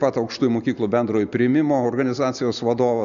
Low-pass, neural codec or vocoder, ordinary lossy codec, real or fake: 7.2 kHz; none; MP3, 96 kbps; real